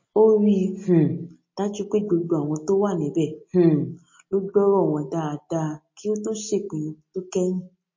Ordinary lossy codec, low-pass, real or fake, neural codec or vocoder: MP3, 32 kbps; 7.2 kHz; real; none